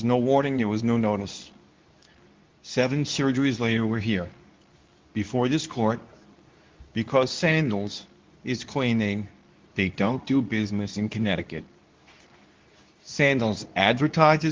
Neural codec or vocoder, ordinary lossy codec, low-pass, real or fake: codec, 24 kHz, 0.9 kbps, WavTokenizer, medium speech release version 2; Opus, 32 kbps; 7.2 kHz; fake